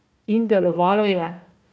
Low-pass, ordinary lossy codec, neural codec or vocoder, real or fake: none; none; codec, 16 kHz, 1 kbps, FunCodec, trained on Chinese and English, 50 frames a second; fake